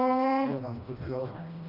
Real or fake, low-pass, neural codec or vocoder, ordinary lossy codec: fake; 5.4 kHz; codec, 16 kHz, 1 kbps, FreqCodec, smaller model; none